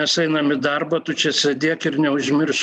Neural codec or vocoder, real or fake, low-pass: none; real; 10.8 kHz